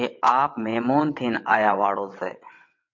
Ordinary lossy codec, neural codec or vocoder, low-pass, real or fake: MP3, 48 kbps; vocoder, 22.05 kHz, 80 mel bands, WaveNeXt; 7.2 kHz; fake